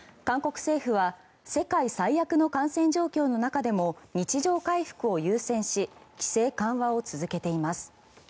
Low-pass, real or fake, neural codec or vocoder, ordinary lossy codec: none; real; none; none